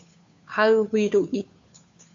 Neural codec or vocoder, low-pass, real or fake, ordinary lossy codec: codec, 16 kHz, 2 kbps, FunCodec, trained on Chinese and English, 25 frames a second; 7.2 kHz; fake; MP3, 64 kbps